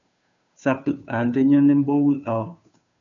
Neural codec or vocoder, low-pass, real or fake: codec, 16 kHz, 2 kbps, FunCodec, trained on Chinese and English, 25 frames a second; 7.2 kHz; fake